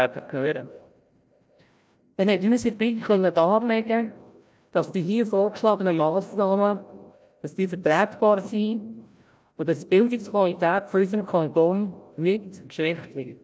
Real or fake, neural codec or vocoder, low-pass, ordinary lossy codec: fake; codec, 16 kHz, 0.5 kbps, FreqCodec, larger model; none; none